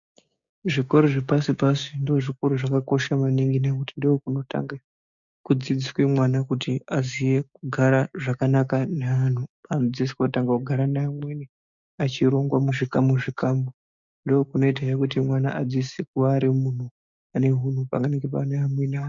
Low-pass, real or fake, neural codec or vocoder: 7.2 kHz; fake; codec, 16 kHz, 6 kbps, DAC